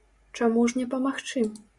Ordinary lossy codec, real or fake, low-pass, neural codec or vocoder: Opus, 64 kbps; real; 10.8 kHz; none